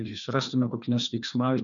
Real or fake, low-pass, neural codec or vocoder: fake; 7.2 kHz; codec, 16 kHz, 2 kbps, FreqCodec, larger model